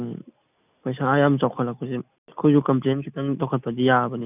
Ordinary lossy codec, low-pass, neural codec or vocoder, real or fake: none; 3.6 kHz; none; real